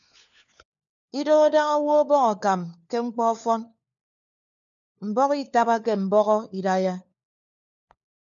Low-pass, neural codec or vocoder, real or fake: 7.2 kHz; codec, 16 kHz, 4 kbps, FunCodec, trained on LibriTTS, 50 frames a second; fake